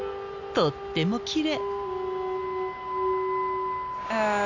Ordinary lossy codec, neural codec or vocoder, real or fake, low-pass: none; none; real; 7.2 kHz